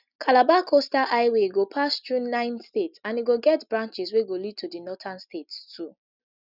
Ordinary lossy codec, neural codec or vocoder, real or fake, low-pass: none; none; real; 5.4 kHz